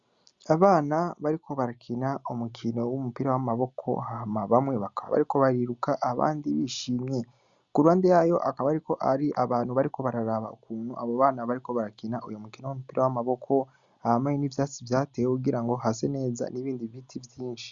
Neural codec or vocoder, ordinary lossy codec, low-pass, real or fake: none; Opus, 64 kbps; 7.2 kHz; real